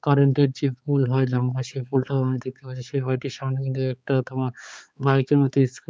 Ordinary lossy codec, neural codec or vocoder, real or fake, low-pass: none; codec, 16 kHz, 4 kbps, X-Codec, HuBERT features, trained on balanced general audio; fake; none